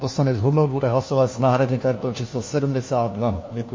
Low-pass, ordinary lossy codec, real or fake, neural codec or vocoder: 7.2 kHz; MP3, 32 kbps; fake; codec, 16 kHz, 1 kbps, FunCodec, trained on LibriTTS, 50 frames a second